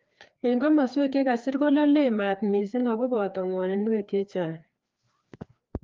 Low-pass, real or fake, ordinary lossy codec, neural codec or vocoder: 7.2 kHz; fake; Opus, 32 kbps; codec, 16 kHz, 2 kbps, FreqCodec, larger model